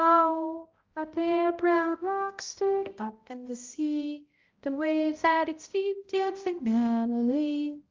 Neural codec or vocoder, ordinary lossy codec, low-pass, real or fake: codec, 16 kHz, 0.5 kbps, X-Codec, HuBERT features, trained on balanced general audio; Opus, 32 kbps; 7.2 kHz; fake